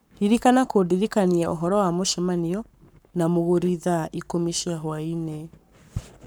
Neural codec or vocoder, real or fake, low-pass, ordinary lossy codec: codec, 44.1 kHz, 7.8 kbps, Pupu-Codec; fake; none; none